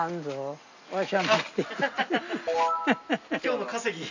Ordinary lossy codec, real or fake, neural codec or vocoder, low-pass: none; real; none; 7.2 kHz